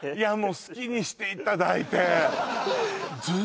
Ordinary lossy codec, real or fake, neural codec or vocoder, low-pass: none; real; none; none